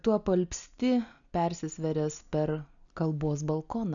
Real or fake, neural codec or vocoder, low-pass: real; none; 7.2 kHz